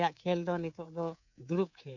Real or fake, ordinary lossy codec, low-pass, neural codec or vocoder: fake; none; 7.2 kHz; codec, 24 kHz, 3.1 kbps, DualCodec